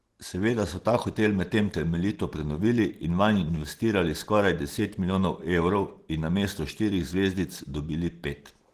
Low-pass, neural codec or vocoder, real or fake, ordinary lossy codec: 14.4 kHz; none; real; Opus, 16 kbps